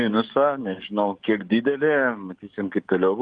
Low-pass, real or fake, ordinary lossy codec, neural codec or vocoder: 9.9 kHz; fake; Opus, 32 kbps; codec, 44.1 kHz, 7.8 kbps, DAC